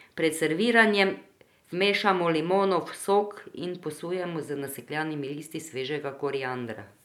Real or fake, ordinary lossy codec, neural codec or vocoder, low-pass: real; none; none; 19.8 kHz